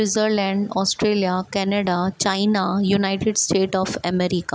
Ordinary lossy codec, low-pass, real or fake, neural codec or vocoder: none; none; real; none